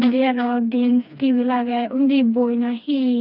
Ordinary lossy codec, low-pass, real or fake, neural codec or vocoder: none; 5.4 kHz; fake; codec, 16 kHz, 2 kbps, FreqCodec, smaller model